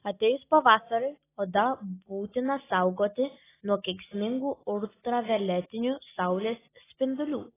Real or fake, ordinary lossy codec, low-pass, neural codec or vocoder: real; AAC, 16 kbps; 3.6 kHz; none